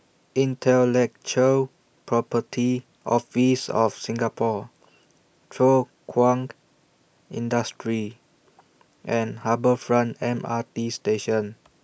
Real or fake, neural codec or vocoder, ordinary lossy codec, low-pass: real; none; none; none